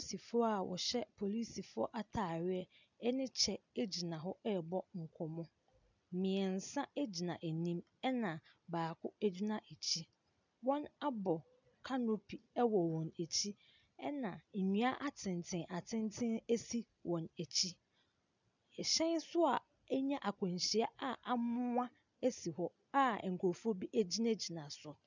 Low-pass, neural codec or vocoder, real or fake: 7.2 kHz; none; real